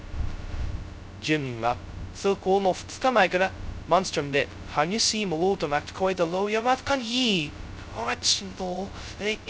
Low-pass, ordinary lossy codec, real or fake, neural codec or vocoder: none; none; fake; codec, 16 kHz, 0.2 kbps, FocalCodec